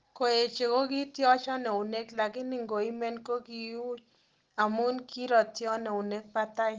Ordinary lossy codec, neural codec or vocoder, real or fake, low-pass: Opus, 16 kbps; none; real; 7.2 kHz